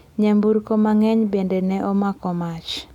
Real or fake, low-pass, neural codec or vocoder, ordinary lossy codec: real; 19.8 kHz; none; none